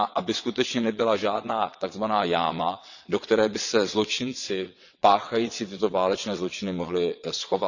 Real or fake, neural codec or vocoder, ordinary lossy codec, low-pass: fake; vocoder, 22.05 kHz, 80 mel bands, WaveNeXt; none; 7.2 kHz